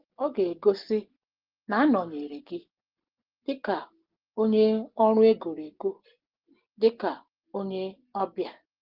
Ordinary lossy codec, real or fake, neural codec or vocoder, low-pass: Opus, 16 kbps; real; none; 5.4 kHz